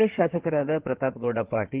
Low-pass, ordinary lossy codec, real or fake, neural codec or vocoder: 3.6 kHz; Opus, 16 kbps; fake; codec, 16 kHz in and 24 kHz out, 2.2 kbps, FireRedTTS-2 codec